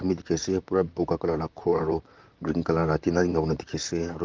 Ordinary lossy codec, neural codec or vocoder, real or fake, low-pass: Opus, 24 kbps; vocoder, 44.1 kHz, 128 mel bands, Pupu-Vocoder; fake; 7.2 kHz